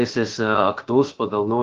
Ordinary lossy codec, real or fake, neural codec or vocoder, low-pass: Opus, 16 kbps; fake; codec, 16 kHz, about 1 kbps, DyCAST, with the encoder's durations; 7.2 kHz